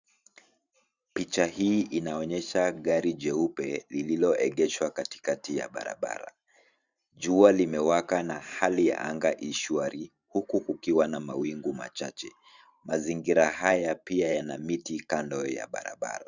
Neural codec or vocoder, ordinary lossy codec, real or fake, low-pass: vocoder, 44.1 kHz, 128 mel bands every 512 samples, BigVGAN v2; Opus, 64 kbps; fake; 7.2 kHz